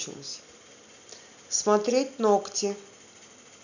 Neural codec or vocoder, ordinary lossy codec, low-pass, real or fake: none; none; 7.2 kHz; real